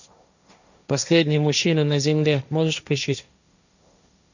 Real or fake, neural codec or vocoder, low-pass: fake; codec, 16 kHz, 1.1 kbps, Voila-Tokenizer; 7.2 kHz